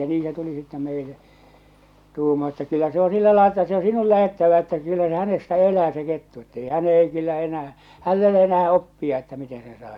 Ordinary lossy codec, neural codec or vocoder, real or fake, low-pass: none; none; real; 19.8 kHz